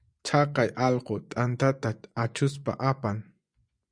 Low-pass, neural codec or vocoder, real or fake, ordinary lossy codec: 9.9 kHz; vocoder, 24 kHz, 100 mel bands, Vocos; fake; Opus, 64 kbps